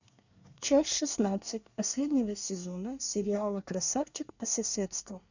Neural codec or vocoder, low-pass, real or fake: codec, 24 kHz, 1 kbps, SNAC; 7.2 kHz; fake